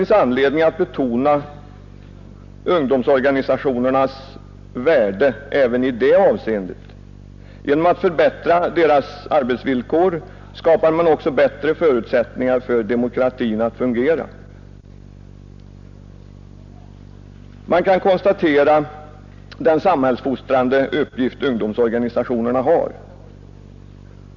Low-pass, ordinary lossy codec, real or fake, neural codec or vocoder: 7.2 kHz; none; real; none